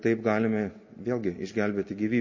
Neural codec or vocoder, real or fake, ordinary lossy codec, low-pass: none; real; MP3, 32 kbps; 7.2 kHz